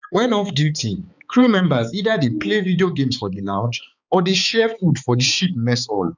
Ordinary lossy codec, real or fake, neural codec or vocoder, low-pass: none; fake; codec, 16 kHz, 4 kbps, X-Codec, HuBERT features, trained on balanced general audio; 7.2 kHz